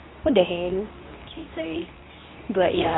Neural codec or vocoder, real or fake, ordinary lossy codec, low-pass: codec, 24 kHz, 0.9 kbps, WavTokenizer, medium speech release version 2; fake; AAC, 16 kbps; 7.2 kHz